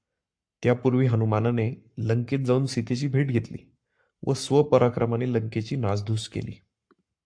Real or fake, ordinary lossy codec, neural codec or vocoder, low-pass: fake; AAC, 64 kbps; codec, 44.1 kHz, 7.8 kbps, Pupu-Codec; 9.9 kHz